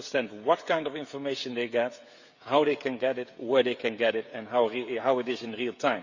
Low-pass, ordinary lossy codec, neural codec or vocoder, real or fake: 7.2 kHz; Opus, 64 kbps; codec, 16 kHz, 16 kbps, FreqCodec, smaller model; fake